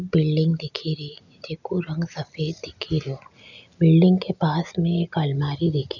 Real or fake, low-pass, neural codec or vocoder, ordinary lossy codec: real; 7.2 kHz; none; none